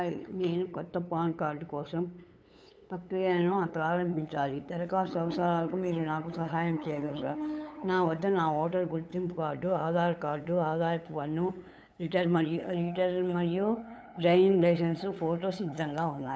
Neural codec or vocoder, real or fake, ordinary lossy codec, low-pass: codec, 16 kHz, 8 kbps, FunCodec, trained on LibriTTS, 25 frames a second; fake; none; none